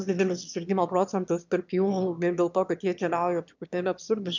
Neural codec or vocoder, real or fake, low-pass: autoencoder, 22.05 kHz, a latent of 192 numbers a frame, VITS, trained on one speaker; fake; 7.2 kHz